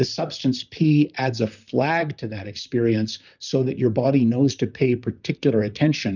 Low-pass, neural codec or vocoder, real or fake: 7.2 kHz; vocoder, 44.1 kHz, 128 mel bands, Pupu-Vocoder; fake